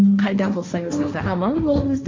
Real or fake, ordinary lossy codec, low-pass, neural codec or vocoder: fake; MP3, 64 kbps; 7.2 kHz; codec, 16 kHz, 1.1 kbps, Voila-Tokenizer